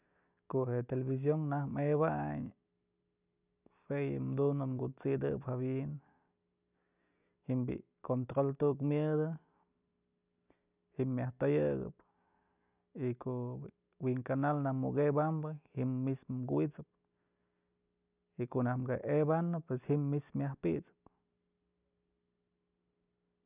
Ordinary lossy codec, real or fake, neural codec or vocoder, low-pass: none; real; none; 3.6 kHz